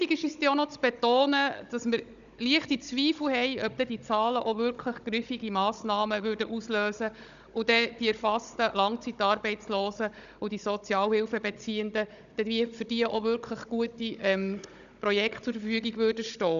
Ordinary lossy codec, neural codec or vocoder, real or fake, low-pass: none; codec, 16 kHz, 16 kbps, FunCodec, trained on Chinese and English, 50 frames a second; fake; 7.2 kHz